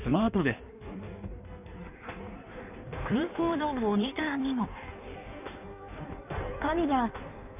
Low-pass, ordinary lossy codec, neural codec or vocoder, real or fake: 3.6 kHz; none; codec, 16 kHz in and 24 kHz out, 1.1 kbps, FireRedTTS-2 codec; fake